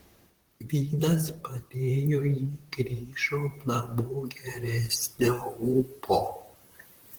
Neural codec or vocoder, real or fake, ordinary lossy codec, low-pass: vocoder, 44.1 kHz, 128 mel bands, Pupu-Vocoder; fake; Opus, 16 kbps; 19.8 kHz